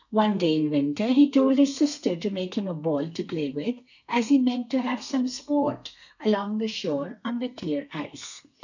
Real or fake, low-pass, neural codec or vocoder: fake; 7.2 kHz; codec, 32 kHz, 1.9 kbps, SNAC